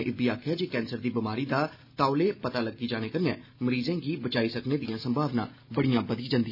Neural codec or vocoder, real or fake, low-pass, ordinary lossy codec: none; real; 5.4 kHz; none